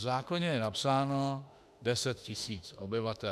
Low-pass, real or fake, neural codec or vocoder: 14.4 kHz; fake; autoencoder, 48 kHz, 32 numbers a frame, DAC-VAE, trained on Japanese speech